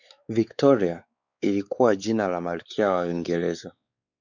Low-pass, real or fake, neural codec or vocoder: 7.2 kHz; fake; codec, 16 kHz, 4 kbps, X-Codec, WavLM features, trained on Multilingual LibriSpeech